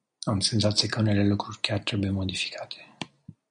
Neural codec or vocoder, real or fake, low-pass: none; real; 9.9 kHz